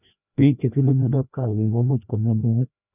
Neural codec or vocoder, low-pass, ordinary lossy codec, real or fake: codec, 16 kHz, 1 kbps, FreqCodec, larger model; 3.6 kHz; none; fake